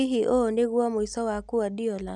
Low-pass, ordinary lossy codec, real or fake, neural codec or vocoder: none; none; real; none